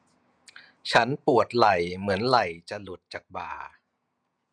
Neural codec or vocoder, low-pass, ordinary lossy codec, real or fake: vocoder, 48 kHz, 128 mel bands, Vocos; 9.9 kHz; none; fake